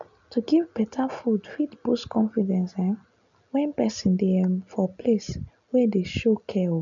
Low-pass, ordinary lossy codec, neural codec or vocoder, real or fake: 7.2 kHz; none; none; real